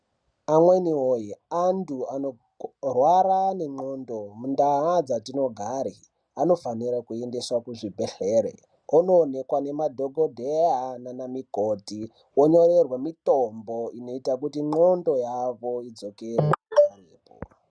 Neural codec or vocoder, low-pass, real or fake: none; 9.9 kHz; real